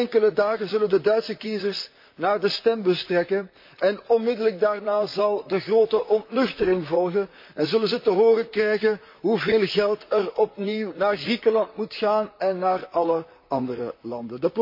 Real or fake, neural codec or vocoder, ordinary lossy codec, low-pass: fake; vocoder, 44.1 kHz, 128 mel bands, Pupu-Vocoder; MP3, 32 kbps; 5.4 kHz